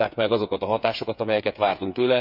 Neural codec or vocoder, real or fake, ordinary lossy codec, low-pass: codec, 16 kHz, 8 kbps, FreqCodec, smaller model; fake; none; 5.4 kHz